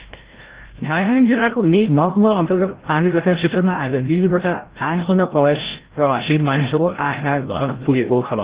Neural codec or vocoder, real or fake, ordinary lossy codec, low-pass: codec, 16 kHz, 0.5 kbps, FreqCodec, larger model; fake; Opus, 16 kbps; 3.6 kHz